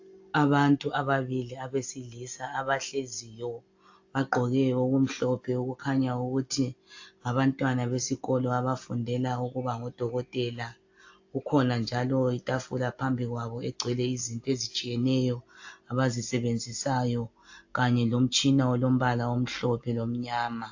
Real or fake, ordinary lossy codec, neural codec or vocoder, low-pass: real; AAC, 48 kbps; none; 7.2 kHz